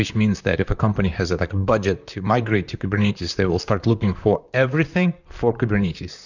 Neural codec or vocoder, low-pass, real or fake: vocoder, 44.1 kHz, 128 mel bands, Pupu-Vocoder; 7.2 kHz; fake